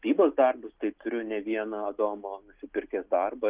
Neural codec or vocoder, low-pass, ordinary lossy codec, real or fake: none; 3.6 kHz; Opus, 24 kbps; real